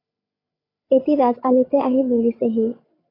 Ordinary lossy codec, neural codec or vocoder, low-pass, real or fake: AAC, 24 kbps; codec, 16 kHz, 8 kbps, FreqCodec, larger model; 5.4 kHz; fake